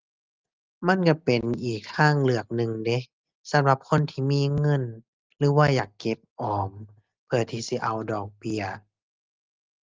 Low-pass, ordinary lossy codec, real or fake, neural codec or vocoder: 7.2 kHz; Opus, 32 kbps; real; none